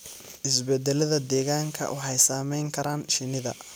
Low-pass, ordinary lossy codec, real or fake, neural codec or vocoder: none; none; real; none